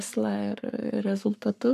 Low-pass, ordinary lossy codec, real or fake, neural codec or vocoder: 14.4 kHz; MP3, 96 kbps; fake; codec, 44.1 kHz, 7.8 kbps, Pupu-Codec